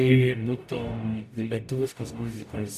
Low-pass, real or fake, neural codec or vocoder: 14.4 kHz; fake; codec, 44.1 kHz, 0.9 kbps, DAC